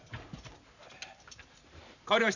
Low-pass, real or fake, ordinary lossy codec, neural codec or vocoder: 7.2 kHz; fake; none; vocoder, 44.1 kHz, 128 mel bands every 512 samples, BigVGAN v2